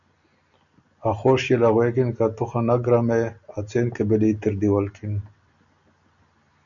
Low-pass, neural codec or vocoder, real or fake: 7.2 kHz; none; real